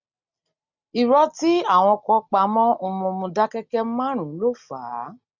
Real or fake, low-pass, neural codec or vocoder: real; 7.2 kHz; none